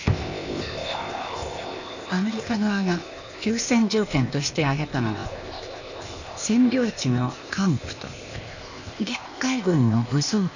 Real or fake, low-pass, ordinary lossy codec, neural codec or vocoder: fake; 7.2 kHz; none; codec, 16 kHz, 0.8 kbps, ZipCodec